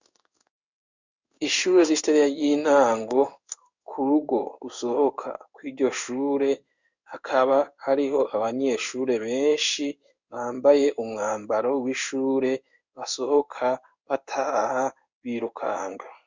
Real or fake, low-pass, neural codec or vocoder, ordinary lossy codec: fake; 7.2 kHz; codec, 16 kHz in and 24 kHz out, 1 kbps, XY-Tokenizer; Opus, 64 kbps